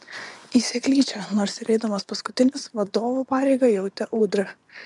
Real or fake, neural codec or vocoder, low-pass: fake; vocoder, 24 kHz, 100 mel bands, Vocos; 10.8 kHz